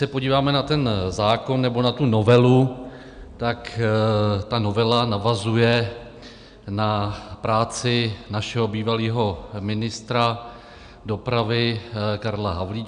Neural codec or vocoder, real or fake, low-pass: none; real; 9.9 kHz